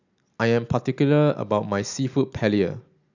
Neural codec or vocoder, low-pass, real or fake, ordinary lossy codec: none; 7.2 kHz; real; none